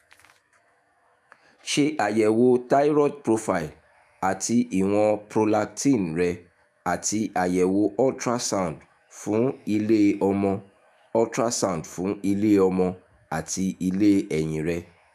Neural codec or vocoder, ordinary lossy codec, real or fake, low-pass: autoencoder, 48 kHz, 128 numbers a frame, DAC-VAE, trained on Japanese speech; none; fake; 14.4 kHz